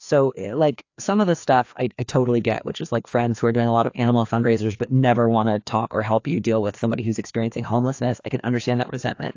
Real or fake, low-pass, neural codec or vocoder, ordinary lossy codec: fake; 7.2 kHz; codec, 16 kHz, 2 kbps, FreqCodec, larger model; AAC, 48 kbps